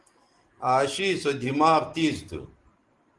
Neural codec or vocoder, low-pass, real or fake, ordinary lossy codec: none; 10.8 kHz; real; Opus, 24 kbps